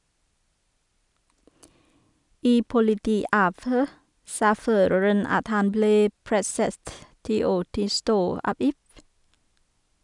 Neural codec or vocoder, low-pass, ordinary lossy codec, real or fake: none; 10.8 kHz; none; real